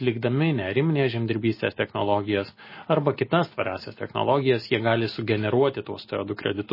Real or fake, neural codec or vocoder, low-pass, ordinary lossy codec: real; none; 5.4 kHz; MP3, 24 kbps